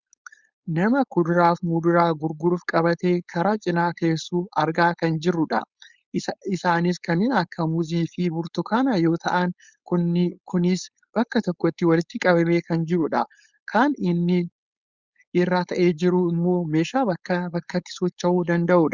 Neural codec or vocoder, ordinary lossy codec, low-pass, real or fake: codec, 16 kHz, 4.8 kbps, FACodec; Opus, 64 kbps; 7.2 kHz; fake